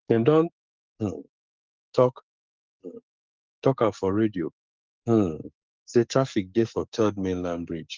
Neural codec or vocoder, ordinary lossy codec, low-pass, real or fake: codec, 44.1 kHz, 7.8 kbps, DAC; Opus, 32 kbps; 7.2 kHz; fake